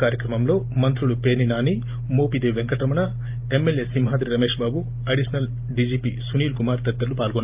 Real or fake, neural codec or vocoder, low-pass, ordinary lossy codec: real; none; 3.6 kHz; Opus, 32 kbps